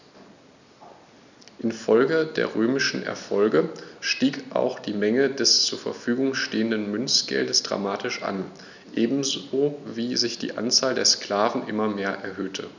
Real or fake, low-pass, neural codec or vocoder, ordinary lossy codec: real; 7.2 kHz; none; none